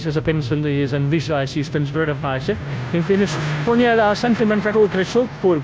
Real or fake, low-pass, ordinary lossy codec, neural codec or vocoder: fake; none; none; codec, 16 kHz, 0.5 kbps, FunCodec, trained on Chinese and English, 25 frames a second